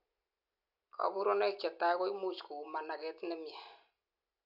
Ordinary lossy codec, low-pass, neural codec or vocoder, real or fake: none; 5.4 kHz; none; real